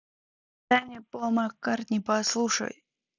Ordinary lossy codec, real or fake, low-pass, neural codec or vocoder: none; real; 7.2 kHz; none